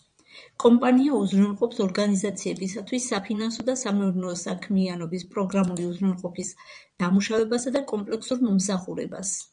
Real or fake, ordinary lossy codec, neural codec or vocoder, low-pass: fake; MP3, 96 kbps; vocoder, 22.05 kHz, 80 mel bands, Vocos; 9.9 kHz